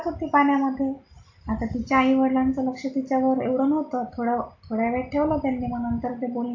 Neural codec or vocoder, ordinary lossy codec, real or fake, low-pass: none; AAC, 48 kbps; real; 7.2 kHz